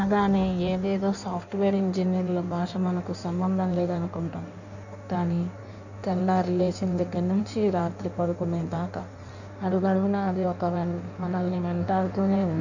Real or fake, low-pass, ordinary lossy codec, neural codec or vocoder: fake; 7.2 kHz; none; codec, 16 kHz in and 24 kHz out, 1.1 kbps, FireRedTTS-2 codec